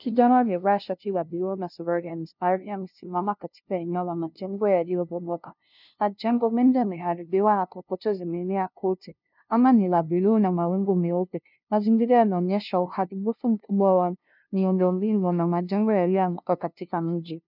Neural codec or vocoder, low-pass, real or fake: codec, 16 kHz, 0.5 kbps, FunCodec, trained on LibriTTS, 25 frames a second; 5.4 kHz; fake